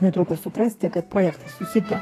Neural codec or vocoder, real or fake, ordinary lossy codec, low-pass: codec, 32 kHz, 1.9 kbps, SNAC; fake; AAC, 48 kbps; 14.4 kHz